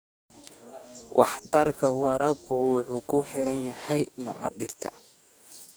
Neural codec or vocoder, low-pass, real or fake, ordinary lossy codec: codec, 44.1 kHz, 2.6 kbps, DAC; none; fake; none